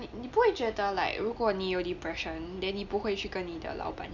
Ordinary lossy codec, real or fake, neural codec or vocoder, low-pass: none; real; none; 7.2 kHz